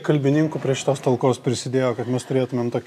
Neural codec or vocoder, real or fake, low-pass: none; real; 14.4 kHz